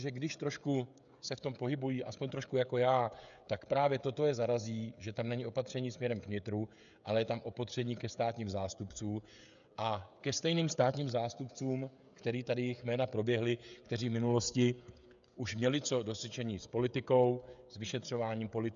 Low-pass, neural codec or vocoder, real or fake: 7.2 kHz; codec, 16 kHz, 16 kbps, FreqCodec, smaller model; fake